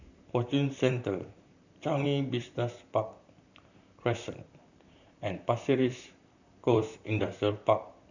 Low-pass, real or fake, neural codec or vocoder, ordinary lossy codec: 7.2 kHz; fake; vocoder, 44.1 kHz, 128 mel bands, Pupu-Vocoder; none